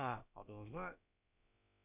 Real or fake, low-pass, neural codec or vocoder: fake; 3.6 kHz; codec, 16 kHz, about 1 kbps, DyCAST, with the encoder's durations